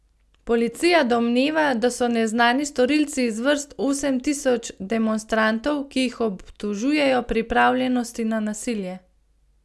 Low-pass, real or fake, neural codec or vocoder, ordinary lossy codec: none; real; none; none